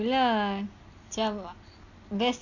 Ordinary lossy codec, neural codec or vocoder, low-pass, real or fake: none; none; 7.2 kHz; real